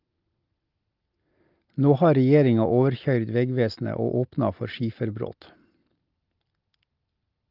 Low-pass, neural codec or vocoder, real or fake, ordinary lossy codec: 5.4 kHz; none; real; Opus, 24 kbps